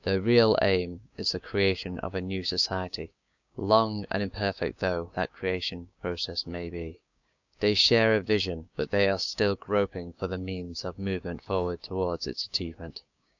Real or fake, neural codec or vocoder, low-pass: fake; codec, 16 kHz, 6 kbps, DAC; 7.2 kHz